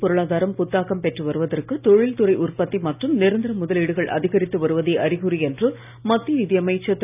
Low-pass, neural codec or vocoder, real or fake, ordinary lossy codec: 3.6 kHz; none; real; none